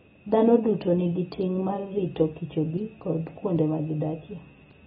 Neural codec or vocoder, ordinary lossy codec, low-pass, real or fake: none; AAC, 16 kbps; 10.8 kHz; real